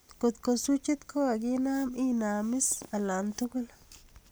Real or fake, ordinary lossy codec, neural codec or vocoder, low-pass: real; none; none; none